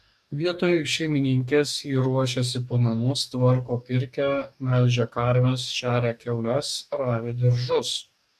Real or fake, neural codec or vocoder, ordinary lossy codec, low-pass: fake; codec, 44.1 kHz, 2.6 kbps, DAC; MP3, 96 kbps; 14.4 kHz